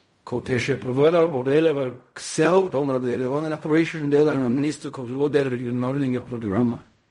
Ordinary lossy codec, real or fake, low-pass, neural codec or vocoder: MP3, 48 kbps; fake; 10.8 kHz; codec, 16 kHz in and 24 kHz out, 0.4 kbps, LongCat-Audio-Codec, fine tuned four codebook decoder